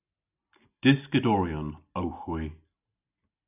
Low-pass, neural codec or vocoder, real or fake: 3.6 kHz; none; real